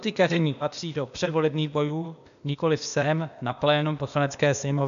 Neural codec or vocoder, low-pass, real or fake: codec, 16 kHz, 0.8 kbps, ZipCodec; 7.2 kHz; fake